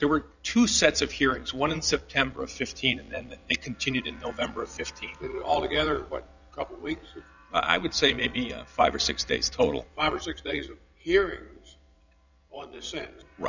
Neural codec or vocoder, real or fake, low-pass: vocoder, 22.05 kHz, 80 mel bands, Vocos; fake; 7.2 kHz